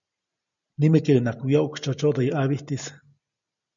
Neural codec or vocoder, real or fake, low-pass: none; real; 7.2 kHz